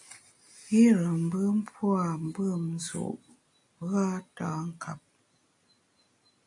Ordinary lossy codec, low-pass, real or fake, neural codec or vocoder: AAC, 64 kbps; 10.8 kHz; real; none